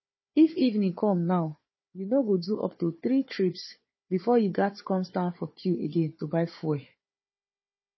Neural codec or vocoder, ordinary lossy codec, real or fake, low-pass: codec, 16 kHz, 4 kbps, FunCodec, trained on Chinese and English, 50 frames a second; MP3, 24 kbps; fake; 7.2 kHz